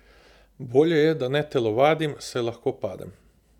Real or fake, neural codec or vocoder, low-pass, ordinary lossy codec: real; none; 19.8 kHz; none